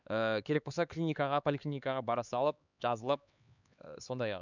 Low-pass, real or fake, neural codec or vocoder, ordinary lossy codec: 7.2 kHz; fake; codec, 16 kHz, 4 kbps, X-Codec, HuBERT features, trained on LibriSpeech; none